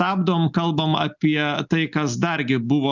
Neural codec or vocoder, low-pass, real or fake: none; 7.2 kHz; real